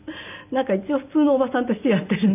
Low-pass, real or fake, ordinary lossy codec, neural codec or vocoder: 3.6 kHz; real; none; none